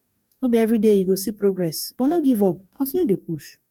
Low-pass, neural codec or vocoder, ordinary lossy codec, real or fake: 19.8 kHz; codec, 44.1 kHz, 2.6 kbps, DAC; none; fake